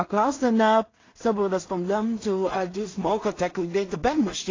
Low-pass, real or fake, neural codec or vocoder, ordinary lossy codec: 7.2 kHz; fake; codec, 16 kHz in and 24 kHz out, 0.4 kbps, LongCat-Audio-Codec, two codebook decoder; AAC, 32 kbps